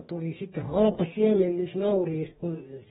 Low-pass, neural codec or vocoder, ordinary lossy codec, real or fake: 19.8 kHz; codec, 44.1 kHz, 2.6 kbps, DAC; AAC, 16 kbps; fake